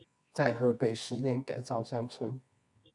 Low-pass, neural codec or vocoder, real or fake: 10.8 kHz; codec, 24 kHz, 0.9 kbps, WavTokenizer, medium music audio release; fake